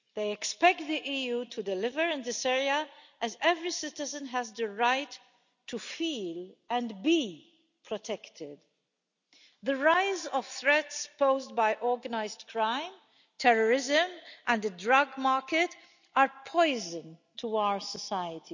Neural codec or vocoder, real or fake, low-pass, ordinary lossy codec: none; real; 7.2 kHz; none